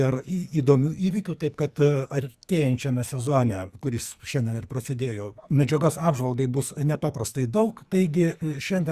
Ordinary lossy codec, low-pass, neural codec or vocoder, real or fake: Opus, 64 kbps; 14.4 kHz; codec, 44.1 kHz, 2.6 kbps, SNAC; fake